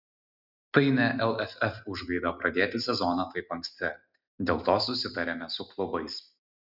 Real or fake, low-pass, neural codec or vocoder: real; 5.4 kHz; none